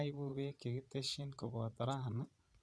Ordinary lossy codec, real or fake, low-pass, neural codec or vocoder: none; fake; none; vocoder, 22.05 kHz, 80 mel bands, Vocos